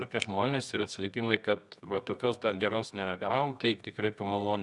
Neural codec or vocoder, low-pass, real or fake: codec, 24 kHz, 0.9 kbps, WavTokenizer, medium music audio release; 10.8 kHz; fake